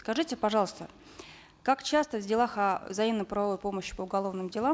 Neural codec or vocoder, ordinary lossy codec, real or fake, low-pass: none; none; real; none